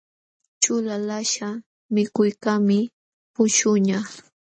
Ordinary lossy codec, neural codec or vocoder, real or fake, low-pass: MP3, 32 kbps; none; real; 9.9 kHz